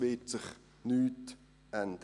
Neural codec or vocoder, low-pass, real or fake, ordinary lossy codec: none; 10.8 kHz; real; none